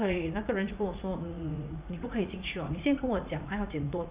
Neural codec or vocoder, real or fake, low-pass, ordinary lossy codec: vocoder, 44.1 kHz, 80 mel bands, Vocos; fake; 3.6 kHz; Opus, 64 kbps